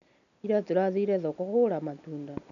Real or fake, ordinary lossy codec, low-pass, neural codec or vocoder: real; MP3, 96 kbps; 7.2 kHz; none